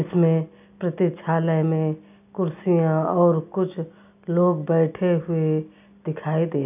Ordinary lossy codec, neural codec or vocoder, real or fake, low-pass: none; none; real; 3.6 kHz